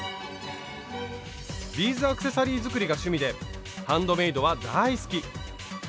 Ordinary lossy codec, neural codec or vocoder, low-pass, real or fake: none; none; none; real